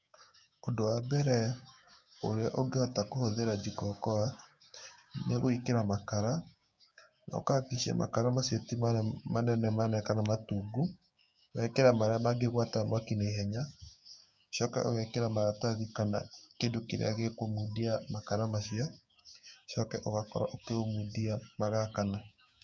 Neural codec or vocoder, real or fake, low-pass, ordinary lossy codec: codec, 16 kHz, 6 kbps, DAC; fake; none; none